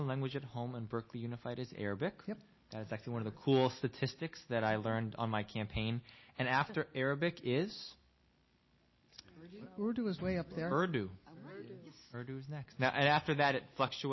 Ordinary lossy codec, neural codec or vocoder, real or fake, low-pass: MP3, 24 kbps; none; real; 7.2 kHz